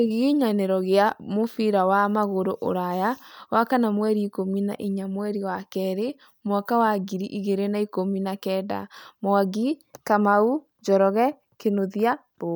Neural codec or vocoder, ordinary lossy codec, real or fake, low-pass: none; none; real; none